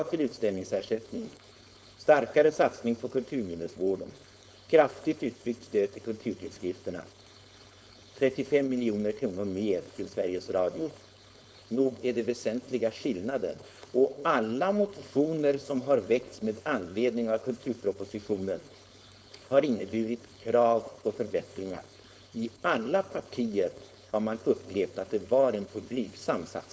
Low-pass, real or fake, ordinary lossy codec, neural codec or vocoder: none; fake; none; codec, 16 kHz, 4.8 kbps, FACodec